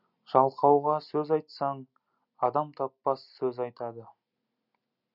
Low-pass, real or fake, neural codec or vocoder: 5.4 kHz; real; none